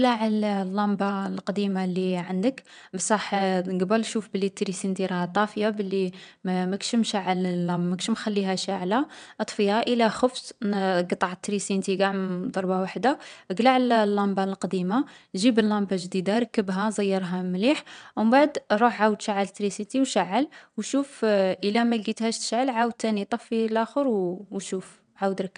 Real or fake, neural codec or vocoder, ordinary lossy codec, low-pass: fake; vocoder, 22.05 kHz, 80 mel bands, WaveNeXt; none; 9.9 kHz